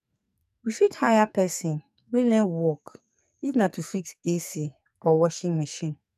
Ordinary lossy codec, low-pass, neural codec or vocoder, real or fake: none; 14.4 kHz; codec, 44.1 kHz, 2.6 kbps, SNAC; fake